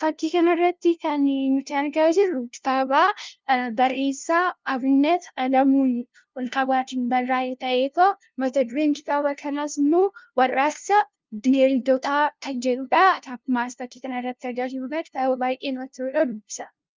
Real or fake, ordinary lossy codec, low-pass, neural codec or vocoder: fake; Opus, 24 kbps; 7.2 kHz; codec, 16 kHz, 0.5 kbps, FunCodec, trained on LibriTTS, 25 frames a second